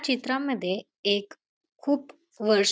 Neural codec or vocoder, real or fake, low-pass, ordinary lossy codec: none; real; none; none